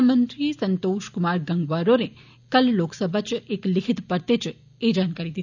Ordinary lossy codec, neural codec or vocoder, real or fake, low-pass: none; none; real; 7.2 kHz